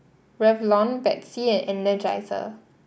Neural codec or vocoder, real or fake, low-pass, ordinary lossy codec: none; real; none; none